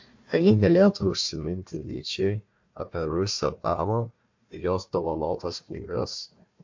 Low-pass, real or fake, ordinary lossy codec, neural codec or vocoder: 7.2 kHz; fake; MP3, 48 kbps; codec, 16 kHz, 1 kbps, FunCodec, trained on Chinese and English, 50 frames a second